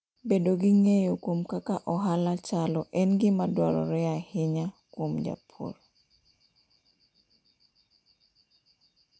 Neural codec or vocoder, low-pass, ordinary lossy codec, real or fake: none; none; none; real